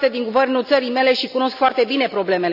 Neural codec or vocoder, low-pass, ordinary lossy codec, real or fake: none; 5.4 kHz; none; real